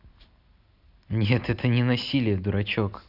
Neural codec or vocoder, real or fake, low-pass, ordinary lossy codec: none; real; 5.4 kHz; none